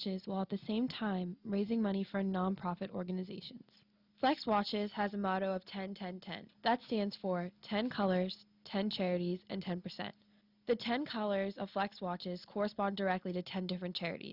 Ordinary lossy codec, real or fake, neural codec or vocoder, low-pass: Opus, 64 kbps; real; none; 5.4 kHz